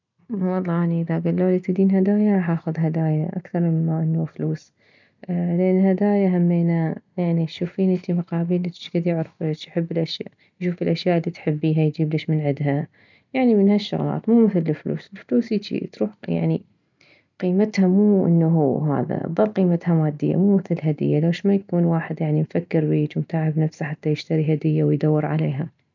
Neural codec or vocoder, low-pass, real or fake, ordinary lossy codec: none; 7.2 kHz; real; none